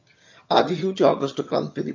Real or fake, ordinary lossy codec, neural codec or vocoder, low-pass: fake; MP3, 48 kbps; vocoder, 22.05 kHz, 80 mel bands, HiFi-GAN; 7.2 kHz